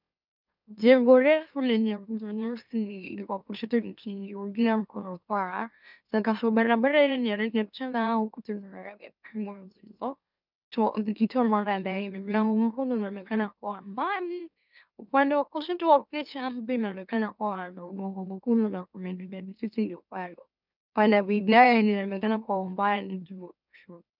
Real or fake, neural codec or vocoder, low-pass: fake; autoencoder, 44.1 kHz, a latent of 192 numbers a frame, MeloTTS; 5.4 kHz